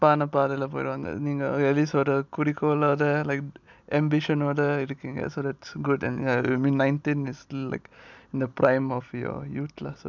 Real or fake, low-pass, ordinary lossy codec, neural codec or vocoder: real; 7.2 kHz; none; none